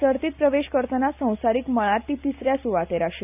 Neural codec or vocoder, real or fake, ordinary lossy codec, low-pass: none; real; none; 3.6 kHz